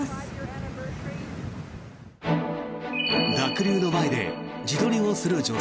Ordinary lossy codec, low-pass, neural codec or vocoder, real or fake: none; none; none; real